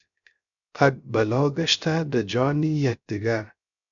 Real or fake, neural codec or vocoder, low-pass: fake; codec, 16 kHz, 0.3 kbps, FocalCodec; 7.2 kHz